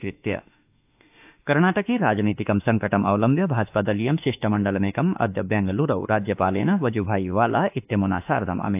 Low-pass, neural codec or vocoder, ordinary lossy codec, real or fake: 3.6 kHz; autoencoder, 48 kHz, 32 numbers a frame, DAC-VAE, trained on Japanese speech; AAC, 32 kbps; fake